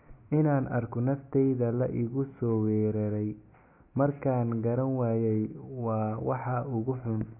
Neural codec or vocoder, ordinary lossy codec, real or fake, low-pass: none; Opus, 64 kbps; real; 3.6 kHz